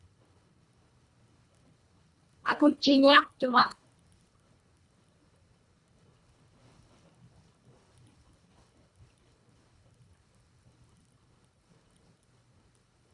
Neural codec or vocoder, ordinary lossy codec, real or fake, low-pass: codec, 24 kHz, 1.5 kbps, HILCodec; Opus, 64 kbps; fake; 10.8 kHz